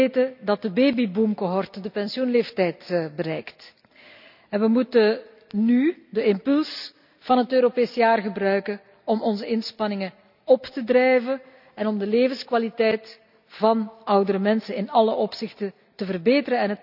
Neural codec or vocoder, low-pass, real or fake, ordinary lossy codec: none; 5.4 kHz; real; none